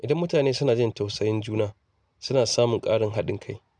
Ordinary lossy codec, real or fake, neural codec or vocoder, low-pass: none; real; none; none